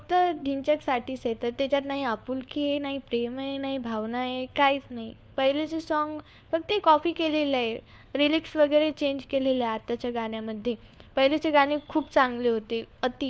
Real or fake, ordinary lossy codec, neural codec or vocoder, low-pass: fake; none; codec, 16 kHz, 4 kbps, FunCodec, trained on LibriTTS, 50 frames a second; none